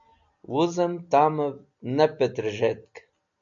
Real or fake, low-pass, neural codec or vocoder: real; 7.2 kHz; none